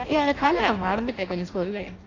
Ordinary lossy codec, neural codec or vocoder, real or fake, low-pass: AAC, 32 kbps; codec, 16 kHz in and 24 kHz out, 0.6 kbps, FireRedTTS-2 codec; fake; 7.2 kHz